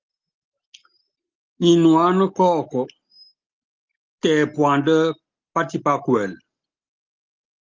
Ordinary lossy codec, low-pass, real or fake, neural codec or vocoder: Opus, 32 kbps; 7.2 kHz; real; none